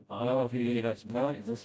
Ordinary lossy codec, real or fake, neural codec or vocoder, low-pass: none; fake; codec, 16 kHz, 0.5 kbps, FreqCodec, smaller model; none